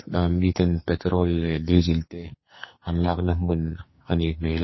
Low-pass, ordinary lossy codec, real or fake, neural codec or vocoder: 7.2 kHz; MP3, 24 kbps; fake; codec, 44.1 kHz, 2.6 kbps, SNAC